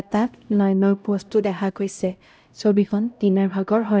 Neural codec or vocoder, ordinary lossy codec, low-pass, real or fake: codec, 16 kHz, 0.5 kbps, X-Codec, HuBERT features, trained on LibriSpeech; none; none; fake